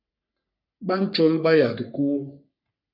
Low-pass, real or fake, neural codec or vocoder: 5.4 kHz; fake; codec, 44.1 kHz, 3.4 kbps, Pupu-Codec